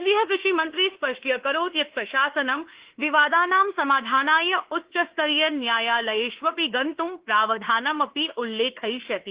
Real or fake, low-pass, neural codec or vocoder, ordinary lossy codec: fake; 3.6 kHz; autoencoder, 48 kHz, 32 numbers a frame, DAC-VAE, trained on Japanese speech; Opus, 16 kbps